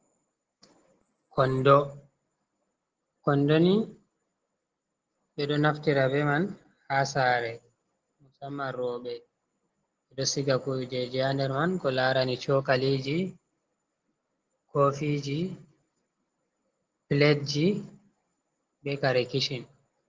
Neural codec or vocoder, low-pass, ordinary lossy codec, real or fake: none; 7.2 kHz; Opus, 16 kbps; real